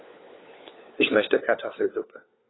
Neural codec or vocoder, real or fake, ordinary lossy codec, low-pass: codec, 16 kHz, 4 kbps, FunCodec, trained on LibriTTS, 50 frames a second; fake; AAC, 16 kbps; 7.2 kHz